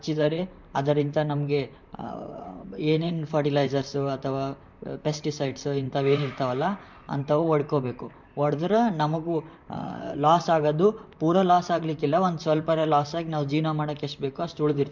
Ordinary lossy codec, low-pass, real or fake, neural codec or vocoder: MP3, 64 kbps; 7.2 kHz; fake; vocoder, 44.1 kHz, 128 mel bands, Pupu-Vocoder